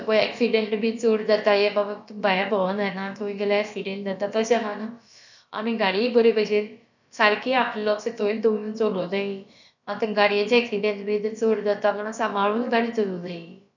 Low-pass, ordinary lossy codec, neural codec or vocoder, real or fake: 7.2 kHz; none; codec, 16 kHz, about 1 kbps, DyCAST, with the encoder's durations; fake